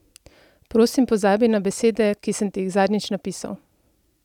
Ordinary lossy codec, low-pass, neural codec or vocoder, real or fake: none; 19.8 kHz; none; real